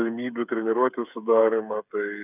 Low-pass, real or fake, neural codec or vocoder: 3.6 kHz; fake; codec, 16 kHz, 8 kbps, FreqCodec, smaller model